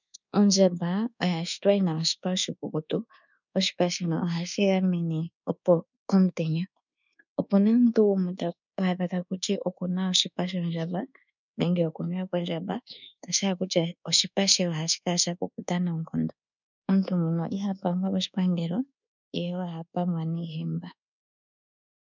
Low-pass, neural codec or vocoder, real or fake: 7.2 kHz; codec, 24 kHz, 1.2 kbps, DualCodec; fake